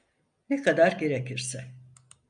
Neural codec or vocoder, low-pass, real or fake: none; 9.9 kHz; real